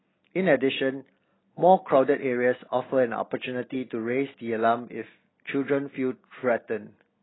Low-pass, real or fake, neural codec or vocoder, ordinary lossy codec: 7.2 kHz; real; none; AAC, 16 kbps